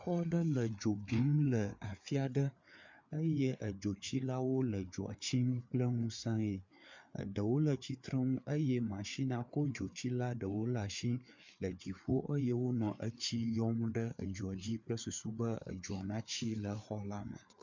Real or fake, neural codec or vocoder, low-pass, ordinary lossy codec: fake; codec, 16 kHz, 4 kbps, FreqCodec, larger model; 7.2 kHz; MP3, 64 kbps